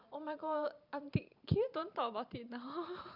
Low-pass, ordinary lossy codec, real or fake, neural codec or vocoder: 5.4 kHz; none; fake; vocoder, 44.1 kHz, 128 mel bands every 512 samples, BigVGAN v2